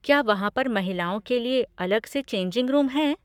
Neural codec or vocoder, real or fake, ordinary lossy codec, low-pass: codec, 44.1 kHz, 7.8 kbps, Pupu-Codec; fake; none; 19.8 kHz